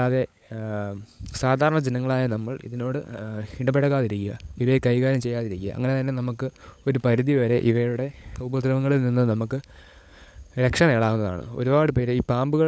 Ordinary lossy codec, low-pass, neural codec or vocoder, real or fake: none; none; codec, 16 kHz, 16 kbps, FunCodec, trained on LibriTTS, 50 frames a second; fake